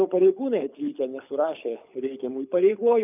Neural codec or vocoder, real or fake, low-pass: codec, 24 kHz, 6 kbps, HILCodec; fake; 3.6 kHz